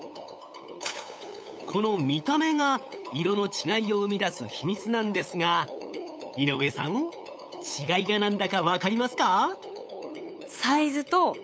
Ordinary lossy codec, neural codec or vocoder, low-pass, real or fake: none; codec, 16 kHz, 8 kbps, FunCodec, trained on LibriTTS, 25 frames a second; none; fake